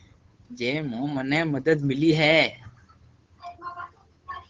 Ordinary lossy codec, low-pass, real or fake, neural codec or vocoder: Opus, 16 kbps; 7.2 kHz; fake; codec, 16 kHz, 8 kbps, FunCodec, trained on Chinese and English, 25 frames a second